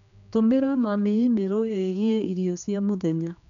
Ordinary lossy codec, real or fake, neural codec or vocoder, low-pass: none; fake; codec, 16 kHz, 2 kbps, X-Codec, HuBERT features, trained on general audio; 7.2 kHz